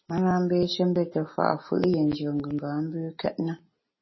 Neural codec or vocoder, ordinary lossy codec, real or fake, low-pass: none; MP3, 24 kbps; real; 7.2 kHz